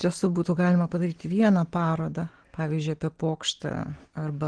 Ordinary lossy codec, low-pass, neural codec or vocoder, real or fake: Opus, 16 kbps; 9.9 kHz; none; real